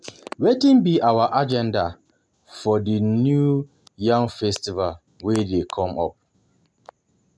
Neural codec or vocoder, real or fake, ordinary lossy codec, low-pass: none; real; none; none